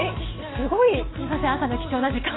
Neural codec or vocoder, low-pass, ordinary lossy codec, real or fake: none; 7.2 kHz; AAC, 16 kbps; real